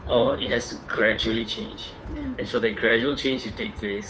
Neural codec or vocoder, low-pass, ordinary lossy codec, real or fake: codec, 16 kHz, 2 kbps, FunCodec, trained on Chinese and English, 25 frames a second; none; none; fake